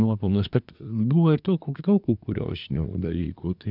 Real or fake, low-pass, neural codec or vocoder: fake; 5.4 kHz; codec, 24 kHz, 1 kbps, SNAC